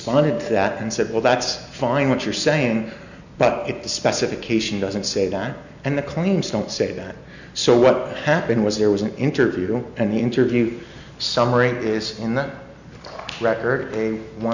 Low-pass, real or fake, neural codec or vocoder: 7.2 kHz; real; none